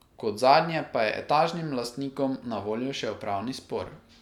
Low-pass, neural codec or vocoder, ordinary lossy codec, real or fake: 19.8 kHz; none; none; real